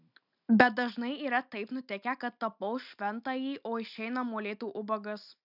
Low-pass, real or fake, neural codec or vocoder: 5.4 kHz; real; none